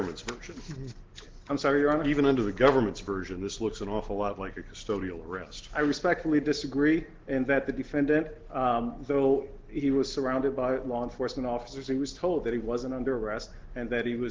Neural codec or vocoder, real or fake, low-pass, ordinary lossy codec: none; real; 7.2 kHz; Opus, 16 kbps